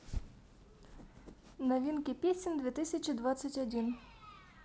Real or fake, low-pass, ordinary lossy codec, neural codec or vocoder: real; none; none; none